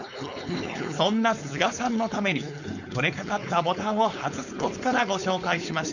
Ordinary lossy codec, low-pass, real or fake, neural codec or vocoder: none; 7.2 kHz; fake; codec, 16 kHz, 4.8 kbps, FACodec